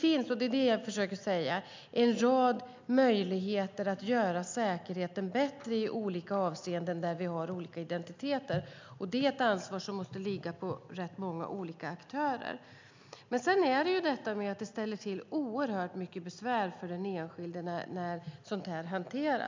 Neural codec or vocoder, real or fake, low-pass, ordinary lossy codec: none; real; 7.2 kHz; MP3, 64 kbps